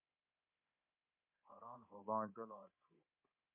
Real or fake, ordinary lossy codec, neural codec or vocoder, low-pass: fake; MP3, 24 kbps; codec, 24 kHz, 3.1 kbps, DualCodec; 3.6 kHz